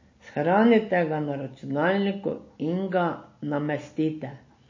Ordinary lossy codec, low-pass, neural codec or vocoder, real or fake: MP3, 32 kbps; 7.2 kHz; none; real